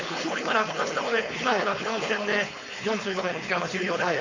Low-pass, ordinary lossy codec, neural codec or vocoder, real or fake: 7.2 kHz; MP3, 48 kbps; codec, 16 kHz, 4.8 kbps, FACodec; fake